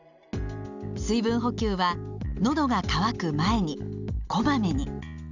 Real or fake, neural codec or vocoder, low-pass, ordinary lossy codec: real; none; 7.2 kHz; none